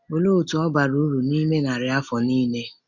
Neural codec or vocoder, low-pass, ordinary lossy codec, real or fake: none; 7.2 kHz; none; real